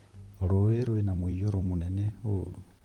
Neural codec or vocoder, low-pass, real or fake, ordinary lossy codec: vocoder, 44.1 kHz, 128 mel bands, Pupu-Vocoder; 19.8 kHz; fake; Opus, 32 kbps